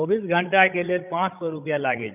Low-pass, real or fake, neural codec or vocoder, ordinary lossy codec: 3.6 kHz; fake; codec, 16 kHz, 16 kbps, FreqCodec, larger model; none